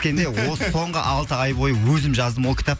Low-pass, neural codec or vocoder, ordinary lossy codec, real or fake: none; none; none; real